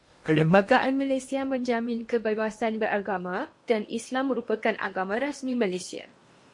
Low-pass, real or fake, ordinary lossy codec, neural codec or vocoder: 10.8 kHz; fake; MP3, 48 kbps; codec, 16 kHz in and 24 kHz out, 0.8 kbps, FocalCodec, streaming, 65536 codes